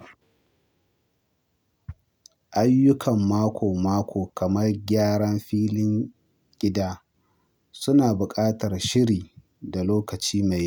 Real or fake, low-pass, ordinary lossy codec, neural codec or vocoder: real; none; none; none